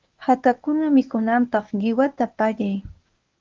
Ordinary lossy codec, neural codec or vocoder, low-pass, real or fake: Opus, 32 kbps; codec, 24 kHz, 0.9 kbps, WavTokenizer, medium speech release version 1; 7.2 kHz; fake